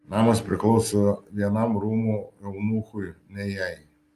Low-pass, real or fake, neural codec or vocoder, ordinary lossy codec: 14.4 kHz; real; none; Opus, 32 kbps